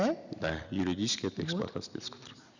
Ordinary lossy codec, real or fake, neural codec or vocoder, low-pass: none; real; none; 7.2 kHz